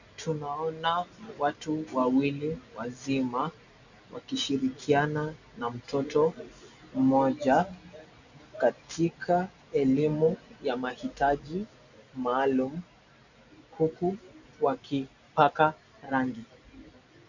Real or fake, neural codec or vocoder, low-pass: real; none; 7.2 kHz